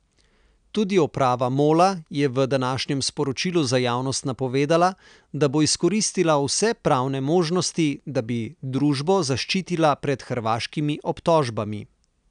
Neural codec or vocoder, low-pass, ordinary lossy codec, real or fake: none; 9.9 kHz; none; real